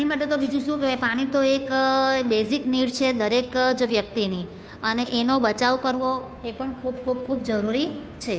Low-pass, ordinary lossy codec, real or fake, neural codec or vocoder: none; none; fake; codec, 16 kHz, 2 kbps, FunCodec, trained on Chinese and English, 25 frames a second